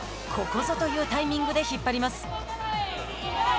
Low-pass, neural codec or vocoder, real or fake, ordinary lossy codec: none; none; real; none